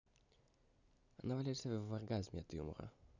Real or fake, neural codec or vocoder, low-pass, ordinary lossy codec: real; none; 7.2 kHz; none